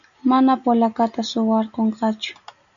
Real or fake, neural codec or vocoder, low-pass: real; none; 7.2 kHz